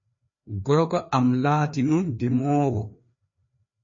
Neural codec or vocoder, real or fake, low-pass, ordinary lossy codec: codec, 16 kHz, 2 kbps, FreqCodec, larger model; fake; 7.2 kHz; MP3, 32 kbps